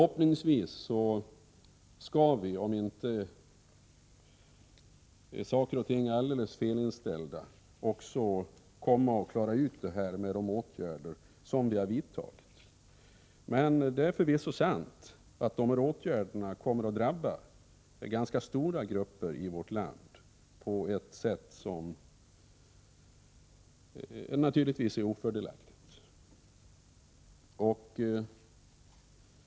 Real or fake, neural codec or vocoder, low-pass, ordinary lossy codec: real; none; none; none